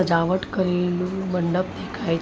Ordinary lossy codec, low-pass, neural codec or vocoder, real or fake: none; none; none; real